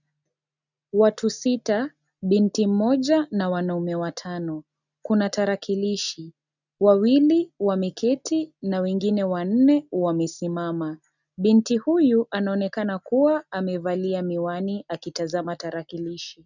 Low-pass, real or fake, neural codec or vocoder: 7.2 kHz; real; none